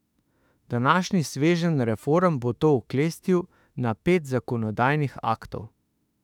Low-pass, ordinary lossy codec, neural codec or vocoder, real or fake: 19.8 kHz; none; autoencoder, 48 kHz, 32 numbers a frame, DAC-VAE, trained on Japanese speech; fake